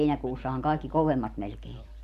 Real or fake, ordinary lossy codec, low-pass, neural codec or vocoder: real; none; 14.4 kHz; none